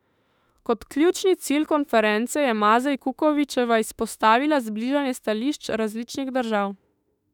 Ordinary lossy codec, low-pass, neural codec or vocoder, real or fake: none; 19.8 kHz; autoencoder, 48 kHz, 32 numbers a frame, DAC-VAE, trained on Japanese speech; fake